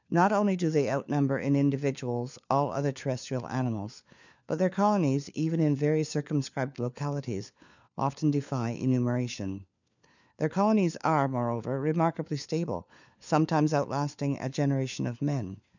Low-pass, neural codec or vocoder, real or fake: 7.2 kHz; codec, 16 kHz, 4 kbps, FunCodec, trained on LibriTTS, 50 frames a second; fake